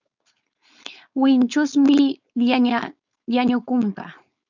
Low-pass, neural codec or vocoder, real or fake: 7.2 kHz; codec, 16 kHz, 4.8 kbps, FACodec; fake